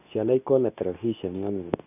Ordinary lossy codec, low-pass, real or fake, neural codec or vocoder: none; 3.6 kHz; fake; codec, 24 kHz, 0.9 kbps, WavTokenizer, medium speech release version 2